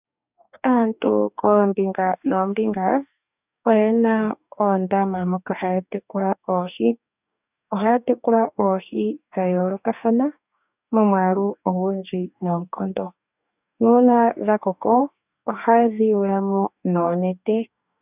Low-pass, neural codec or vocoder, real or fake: 3.6 kHz; codec, 44.1 kHz, 2.6 kbps, DAC; fake